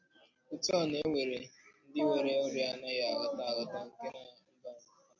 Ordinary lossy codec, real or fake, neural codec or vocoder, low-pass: MP3, 48 kbps; real; none; 7.2 kHz